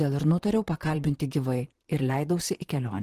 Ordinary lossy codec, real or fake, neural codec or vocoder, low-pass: Opus, 16 kbps; fake; vocoder, 44.1 kHz, 128 mel bands, Pupu-Vocoder; 14.4 kHz